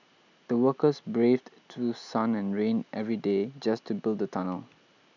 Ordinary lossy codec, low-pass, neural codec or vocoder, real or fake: none; 7.2 kHz; none; real